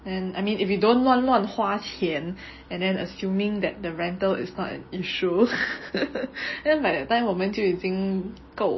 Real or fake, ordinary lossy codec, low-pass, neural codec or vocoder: real; MP3, 24 kbps; 7.2 kHz; none